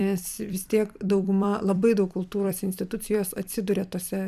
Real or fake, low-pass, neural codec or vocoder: fake; 14.4 kHz; vocoder, 44.1 kHz, 128 mel bands every 256 samples, BigVGAN v2